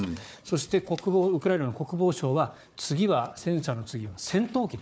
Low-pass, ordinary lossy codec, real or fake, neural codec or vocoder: none; none; fake; codec, 16 kHz, 4 kbps, FunCodec, trained on Chinese and English, 50 frames a second